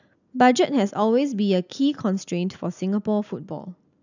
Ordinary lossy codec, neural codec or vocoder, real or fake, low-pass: none; none; real; 7.2 kHz